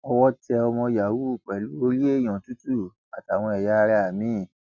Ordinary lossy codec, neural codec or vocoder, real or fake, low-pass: none; none; real; 7.2 kHz